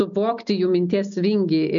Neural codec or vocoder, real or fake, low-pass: none; real; 7.2 kHz